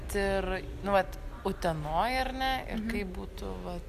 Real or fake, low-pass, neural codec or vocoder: real; 14.4 kHz; none